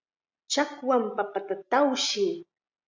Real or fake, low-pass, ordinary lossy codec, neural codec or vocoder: real; 7.2 kHz; MP3, 64 kbps; none